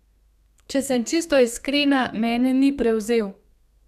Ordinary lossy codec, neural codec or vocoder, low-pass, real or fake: none; codec, 32 kHz, 1.9 kbps, SNAC; 14.4 kHz; fake